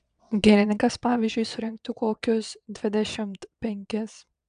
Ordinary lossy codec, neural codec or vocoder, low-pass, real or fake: AAC, 96 kbps; vocoder, 22.05 kHz, 80 mel bands, WaveNeXt; 9.9 kHz; fake